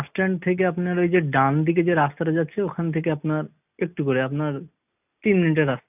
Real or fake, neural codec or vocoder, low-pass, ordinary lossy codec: real; none; 3.6 kHz; none